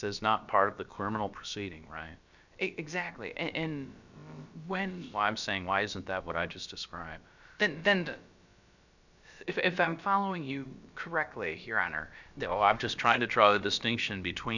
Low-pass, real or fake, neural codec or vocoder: 7.2 kHz; fake; codec, 16 kHz, about 1 kbps, DyCAST, with the encoder's durations